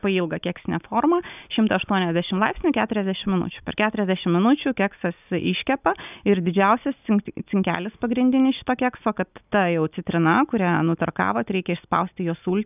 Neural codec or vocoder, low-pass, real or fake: none; 3.6 kHz; real